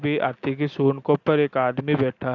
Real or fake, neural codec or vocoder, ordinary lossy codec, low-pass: real; none; AAC, 48 kbps; 7.2 kHz